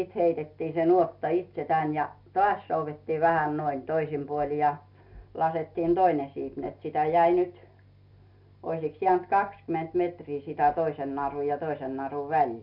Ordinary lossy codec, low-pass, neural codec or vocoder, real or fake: none; 5.4 kHz; none; real